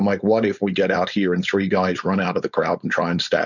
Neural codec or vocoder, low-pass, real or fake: codec, 16 kHz, 4.8 kbps, FACodec; 7.2 kHz; fake